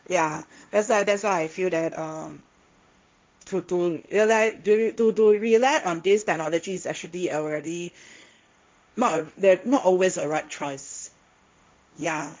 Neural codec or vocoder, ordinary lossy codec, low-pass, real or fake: codec, 16 kHz, 1.1 kbps, Voila-Tokenizer; none; none; fake